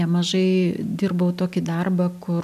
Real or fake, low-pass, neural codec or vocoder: real; 14.4 kHz; none